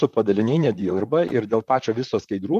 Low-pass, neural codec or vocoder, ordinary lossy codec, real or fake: 14.4 kHz; vocoder, 44.1 kHz, 128 mel bands, Pupu-Vocoder; MP3, 96 kbps; fake